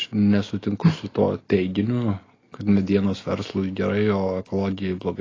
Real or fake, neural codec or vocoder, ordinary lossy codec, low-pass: real; none; AAC, 32 kbps; 7.2 kHz